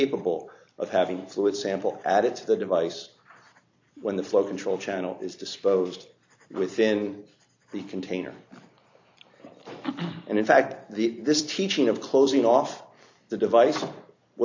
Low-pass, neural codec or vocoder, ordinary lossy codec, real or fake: 7.2 kHz; vocoder, 44.1 kHz, 128 mel bands every 256 samples, BigVGAN v2; AAC, 48 kbps; fake